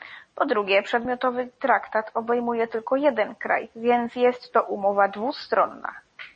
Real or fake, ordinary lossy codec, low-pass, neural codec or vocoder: real; MP3, 32 kbps; 10.8 kHz; none